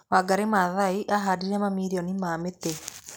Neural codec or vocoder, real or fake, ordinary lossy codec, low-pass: none; real; none; none